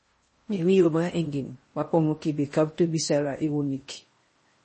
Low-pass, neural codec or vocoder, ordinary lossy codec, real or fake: 10.8 kHz; codec, 16 kHz in and 24 kHz out, 0.6 kbps, FocalCodec, streaming, 4096 codes; MP3, 32 kbps; fake